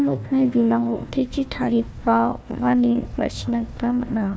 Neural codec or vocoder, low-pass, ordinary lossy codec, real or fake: codec, 16 kHz, 1 kbps, FunCodec, trained on Chinese and English, 50 frames a second; none; none; fake